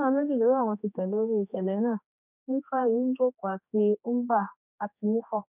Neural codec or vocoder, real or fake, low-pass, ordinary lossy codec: codec, 16 kHz, 2 kbps, X-Codec, HuBERT features, trained on general audio; fake; 3.6 kHz; none